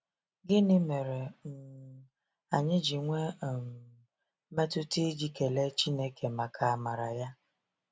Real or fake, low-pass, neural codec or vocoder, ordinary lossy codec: real; none; none; none